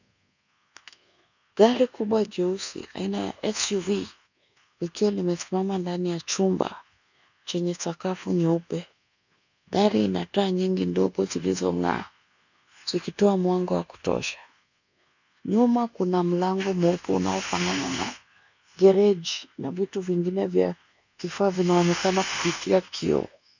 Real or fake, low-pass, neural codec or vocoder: fake; 7.2 kHz; codec, 24 kHz, 1.2 kbps, DualCodec